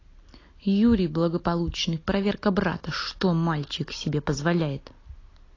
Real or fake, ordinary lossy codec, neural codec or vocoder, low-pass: real; AAC, 32 kbps; none; 7.2 kHz